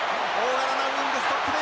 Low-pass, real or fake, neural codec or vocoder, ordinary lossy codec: none; real; none; none